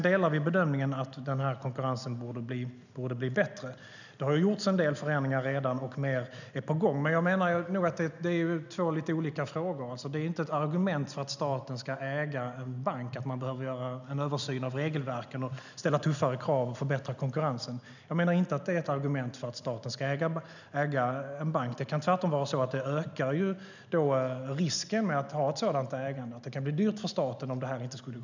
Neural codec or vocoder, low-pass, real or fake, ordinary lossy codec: none; 7.2 kHz; real; none